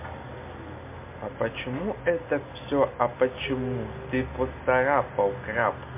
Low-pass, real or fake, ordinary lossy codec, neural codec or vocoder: 3.6 kHz; real; MP3, 24 kbps; none